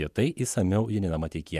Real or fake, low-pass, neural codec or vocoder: fake; 14.4 kHz; vocoder, 48 kHz, 128 mel bands, Vocos